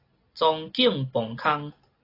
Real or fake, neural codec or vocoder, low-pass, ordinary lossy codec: real; none; 5.4 kHz; AAC, 24 kbps